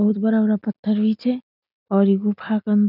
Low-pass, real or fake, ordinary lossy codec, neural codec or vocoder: 5.4 kHz; real; none; none